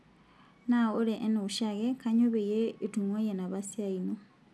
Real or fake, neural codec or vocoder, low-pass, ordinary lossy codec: real; none; none; none